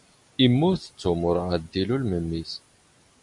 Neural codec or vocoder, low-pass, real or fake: none; 10.8 kHz; real